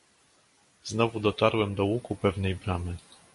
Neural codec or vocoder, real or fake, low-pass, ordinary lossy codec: none; real; 14.4 kHz; MP3, 48 kbps